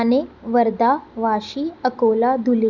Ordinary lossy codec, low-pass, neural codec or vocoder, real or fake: none; 7.2 kHz; none; real